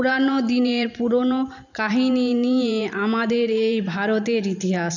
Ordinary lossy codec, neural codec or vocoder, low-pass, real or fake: none; vocoder, 44.1 kHz, 128 mel bands every 512 samples, BigVGAN v2; 7.2 kHz; fake